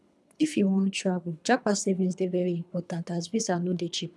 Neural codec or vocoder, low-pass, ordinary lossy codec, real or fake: codec, 24 kHz, 3 kbps, HILCodec; none; none; fake